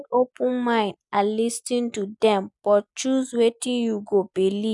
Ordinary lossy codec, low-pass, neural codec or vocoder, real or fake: none; 10.8 kHz; none; real